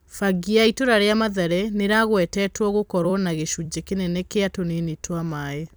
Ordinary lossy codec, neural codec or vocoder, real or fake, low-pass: none; vocoder, 44.1 kHz, 128 mel bands every 256 samples, BigVGAN v2; fake; none